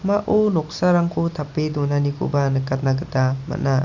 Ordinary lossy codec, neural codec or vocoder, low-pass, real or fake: none; none; 7.2 kHz; real